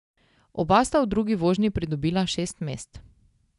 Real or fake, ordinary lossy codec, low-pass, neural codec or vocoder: real; none; 10.8 kHz; none